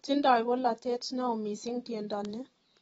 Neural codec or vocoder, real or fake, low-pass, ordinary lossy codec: vocoder, 44.1 kHz, 128 mel bands every 512 samples, BigVGAN v2; fake; 19.8 kHz; AAC, 24 kbps